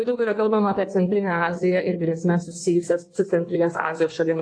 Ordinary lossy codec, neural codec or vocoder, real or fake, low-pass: AAC, 48 kbps; codec, 16 kHz in and 24 kHz out, 1.1 kbps, FireRedTTS-2 codec; fake; 9.9 kHz